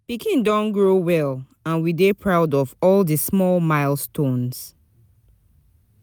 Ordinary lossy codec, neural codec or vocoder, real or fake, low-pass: none; none; real; none